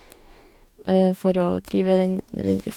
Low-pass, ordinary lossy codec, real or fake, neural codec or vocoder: 19.8 kHz; none; fake; codec, 44.1 kHz, 2.6 kbps, DAC